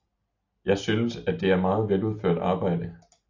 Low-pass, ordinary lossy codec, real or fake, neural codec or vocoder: 7.2 kHz; AAC, 48 kbps; real; none